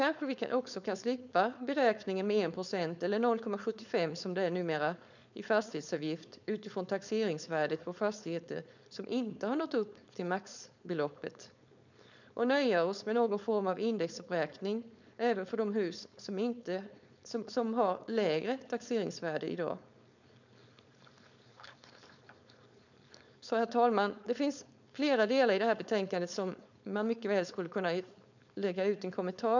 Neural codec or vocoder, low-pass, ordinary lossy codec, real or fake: codec, 16 kHz, 4.8 kbps, FACodec; 7.2 kHz; none; fake